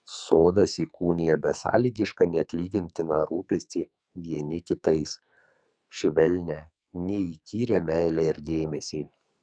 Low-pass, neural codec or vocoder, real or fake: 9.9 kHz; codec, 44.1 kHz, 2.6 kbps, SNAC; fake